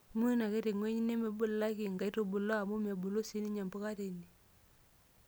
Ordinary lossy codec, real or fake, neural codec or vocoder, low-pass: none; real; none; none